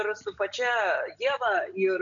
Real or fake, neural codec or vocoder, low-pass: real; none; 7.2 kHz